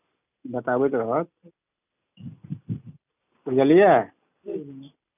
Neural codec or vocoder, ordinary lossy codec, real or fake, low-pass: none; none; real; 3.6 kHz